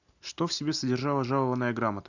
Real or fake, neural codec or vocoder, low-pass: real; none; 7.2 kHz